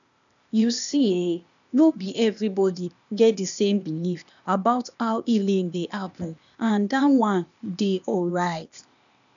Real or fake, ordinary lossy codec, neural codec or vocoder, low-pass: fake; none; codec, 16 kHz, 0.8 kbps, ZipCodec; 7.2 kHz